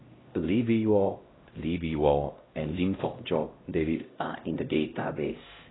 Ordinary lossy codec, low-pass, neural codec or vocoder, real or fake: AAC, 16 kbps; 7.2 kHz; codec, 16 kHz, 0.5 kbps, X-Codec, HuBERT features, trained on LibriSpeech; fake